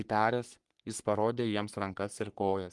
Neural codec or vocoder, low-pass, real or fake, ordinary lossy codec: codec, 44.1 kHz, 3.4 kbps, Pupu-Codec; 10.8 kHz; fake; Opus, 24 kbps